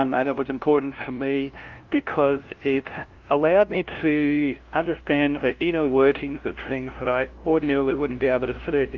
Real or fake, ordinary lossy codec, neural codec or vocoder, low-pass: fake; Opus, 32 kbps; codec, 16 kHz, 0.5 kbps, FunCodec, trained on LibriTTS, 25 frames a second; 7.2 kHz